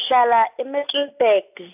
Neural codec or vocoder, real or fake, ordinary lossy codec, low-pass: none; real; AAC, 32 kbps; 3.6 kHz